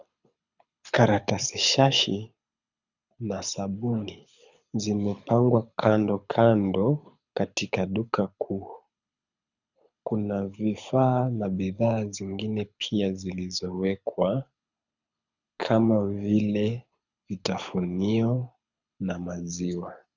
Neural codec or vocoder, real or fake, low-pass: codec, 24 kHz, 6 kbps, HILCodec; fake; 7.2 kHz